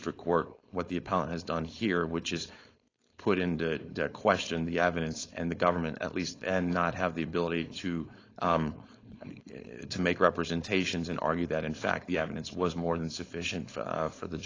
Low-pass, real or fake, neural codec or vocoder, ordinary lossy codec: 7.2 kHz; fake; codec, 16 kHz, 4.8 kbps, FACodec; AAC, 32 kbps